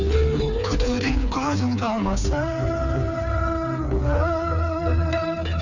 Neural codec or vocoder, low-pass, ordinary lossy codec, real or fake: codec, 16 kHz, 4 kbps, FreqCodec, smaller model; 7.2 kHz; none; fake